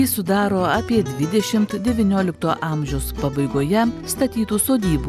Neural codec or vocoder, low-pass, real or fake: none; 14.4 kHz; real